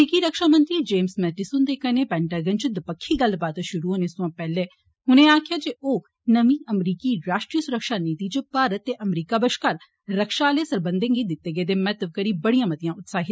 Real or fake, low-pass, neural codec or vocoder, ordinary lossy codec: real; none; none; none